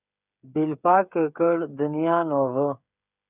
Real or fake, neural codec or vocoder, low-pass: fake; codec, 16 kHz, 8 kbps, FreqCodec, smaller model; 3.6 kHz